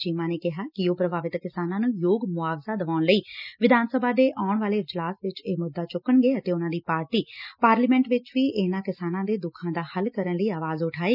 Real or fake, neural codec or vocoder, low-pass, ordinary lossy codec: real; none; 5.4 kHz; none